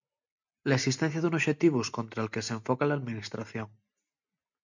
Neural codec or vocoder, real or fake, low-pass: none; real; 7.2 kHz